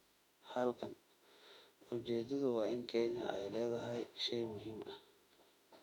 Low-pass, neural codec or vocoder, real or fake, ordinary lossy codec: 19.8 kHz; autoencoder, 48 kHz, 32 numbers a frame, DAC-VAE, trained on Japanese speech; fake; none